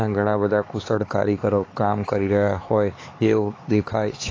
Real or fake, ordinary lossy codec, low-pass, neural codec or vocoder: fake; AAC, 32 kbps; 7.2 kHz; codec, 16 kHz, 4 kbps, X-Codec, HuBERT features, trained on LibriSpeech